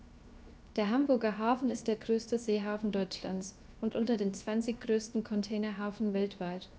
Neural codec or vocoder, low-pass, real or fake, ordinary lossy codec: codec, 16 kHz, 0.7 kbps, FocalCodec; none; fake; none